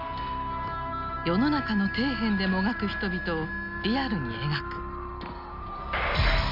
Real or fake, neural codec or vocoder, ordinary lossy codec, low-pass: real; none; none; 5.4 kHz